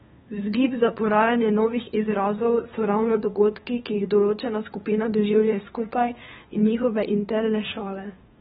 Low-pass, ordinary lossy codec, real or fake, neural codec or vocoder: 7.2 kHz; AAC, 16 kbps; fake; codec, 16 kHz, 2 kbps, FunCodec, trained on LibriTTS, 25 frames a second